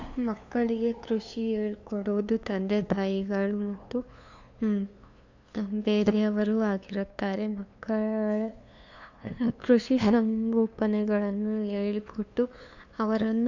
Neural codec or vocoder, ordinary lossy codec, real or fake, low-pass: codec, 16 kHz, 2 kbps, FunCodec, trained on LibriTTS, 25 frames a second; AAC, 48 kbps; fake; 7.2 kHz